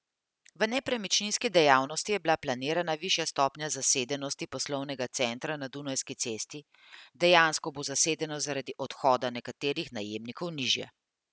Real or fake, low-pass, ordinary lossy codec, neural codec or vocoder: real; none; none; none